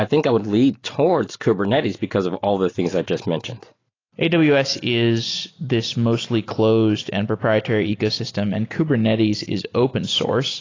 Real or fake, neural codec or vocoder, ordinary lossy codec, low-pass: real; none; AAC, 32 kbps; 7.2 kHz